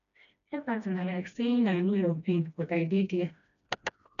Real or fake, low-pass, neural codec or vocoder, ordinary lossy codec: fake; 7.2 kHz; codec, 16 kHz, 1 kbps, FreqCodec, smaller model; none